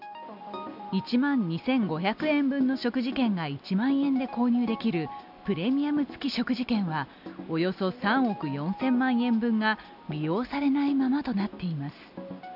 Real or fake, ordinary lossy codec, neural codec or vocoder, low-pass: real; none; none; 5.4 kHz